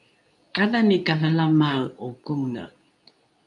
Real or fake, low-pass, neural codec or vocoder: fake; 10.8 kHz; codec, 24 kHz, 0.9 kbps, WavTokenizer, medium speech release version 2